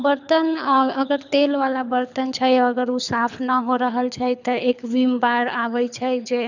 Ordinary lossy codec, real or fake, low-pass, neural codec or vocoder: none; fake; 7.2 kHz; codec, 24 kHz, 6 kbps, HILCodec